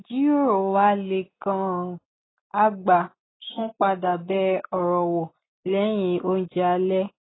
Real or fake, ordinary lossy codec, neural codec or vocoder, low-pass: real; AAC, 16 kbps; none; 7.2 kHz